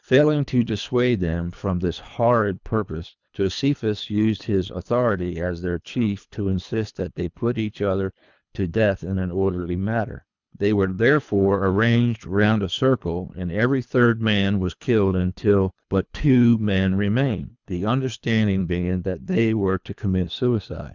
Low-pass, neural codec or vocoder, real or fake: 7.2 kHz; codec, 24 kHz, 3 kbps, HILCodec; fake